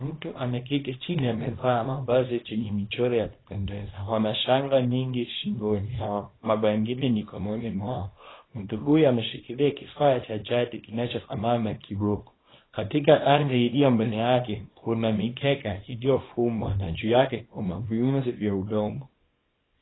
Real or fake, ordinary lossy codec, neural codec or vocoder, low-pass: fake; AAC, 16 kbps; codec, 24 kHz, 0.9 kbps, WavTokenizer, small release; 7.2 kHz